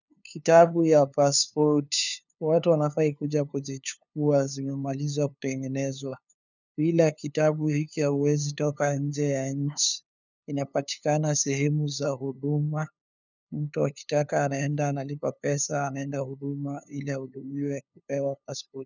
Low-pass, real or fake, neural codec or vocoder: 7.2 kHz; fake; codec, 16 kHz, 2 kbps, FunCodec, trained on LibriTTS, 25 frames a second